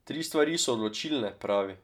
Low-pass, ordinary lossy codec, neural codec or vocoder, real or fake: 19.8 kHz; none; none; real